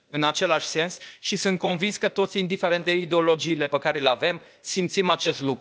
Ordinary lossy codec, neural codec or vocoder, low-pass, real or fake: none; codec, 16 kHz, 0.8 kbps, ZipCodec; none; fake